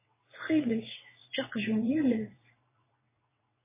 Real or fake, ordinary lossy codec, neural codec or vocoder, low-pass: fake; MP3, 16 kbps; vocoder, 22.05 kHz, 80 mel bands, WaveNeXt; 3.6 kHz